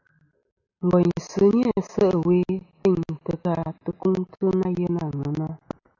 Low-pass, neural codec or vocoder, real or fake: 7.2 kHz; none; real